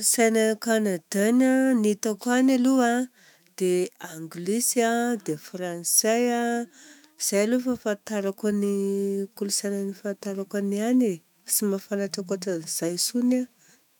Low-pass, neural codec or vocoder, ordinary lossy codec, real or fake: 19.8 kHz; autoencoder, 48 kHz, 128 numbers a frame, DAC-VAE, trained on Japanese speech; none; fake